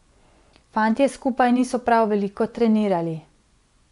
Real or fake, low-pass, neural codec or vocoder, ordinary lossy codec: fake; 10.8 kHz; vocoder, 24 kHz, 100 mel bands, Vocos; none